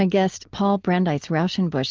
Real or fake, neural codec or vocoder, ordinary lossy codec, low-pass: real; none; Opus, 32 kbps; 7.2 kHz